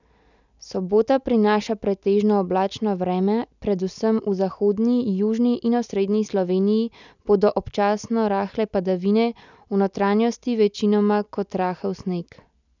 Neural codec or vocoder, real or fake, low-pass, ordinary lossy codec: none; real; 7.2 kHz; none